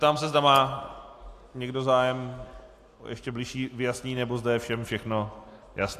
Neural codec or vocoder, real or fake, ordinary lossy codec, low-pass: none; real; AAC, 64 kbps; 14.4 kHz